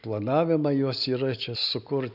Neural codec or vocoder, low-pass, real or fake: none; 5.4 kHz; real